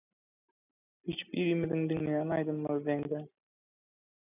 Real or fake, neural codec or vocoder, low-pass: real; none; 3.6 kHz